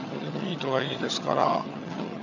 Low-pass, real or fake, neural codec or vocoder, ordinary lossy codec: 7.2 kHz; fake; vocoder, 22.05 kHz, 80 mel bands, HiFi-GAN; none